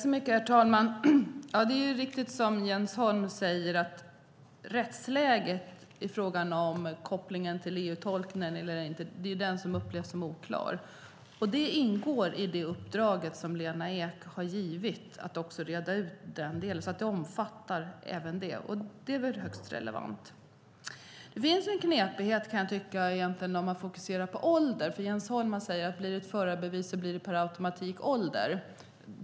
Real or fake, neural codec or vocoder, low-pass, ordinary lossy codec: real; none; none; none